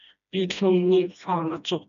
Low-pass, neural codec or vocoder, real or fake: 7.2 kHz; codec, 16 kHz, 1 kbps, FreqCodec, smaller model; fake